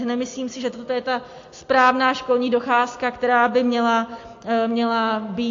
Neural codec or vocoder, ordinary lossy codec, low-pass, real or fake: none; AAC, 48 kbps; 7.2 kHz; real